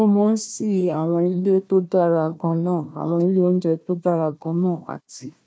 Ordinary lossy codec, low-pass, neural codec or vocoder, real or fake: none; none; codec, 16 kHz, 1 kbps, FunCodec, trained on Chinese and English, 50 frames a second; fake